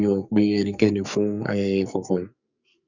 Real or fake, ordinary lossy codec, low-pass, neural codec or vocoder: fake; none; 7.2 kHz; codec, 44.1 kHz, 2.6 kbps, SNAC